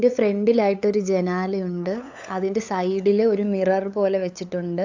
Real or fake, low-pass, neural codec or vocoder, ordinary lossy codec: fake; 7.2 kHz; codec, 16 kHz, 8 kbps, FunCodec, trained on LibriTTS, 25 frames a second; AAC, 48 kbps